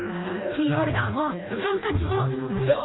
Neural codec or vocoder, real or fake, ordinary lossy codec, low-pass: codec, 16 kHz, 1 kbps, FreqCodec, smaller model; fake; AAC, 16 kbps; 7.2 kHz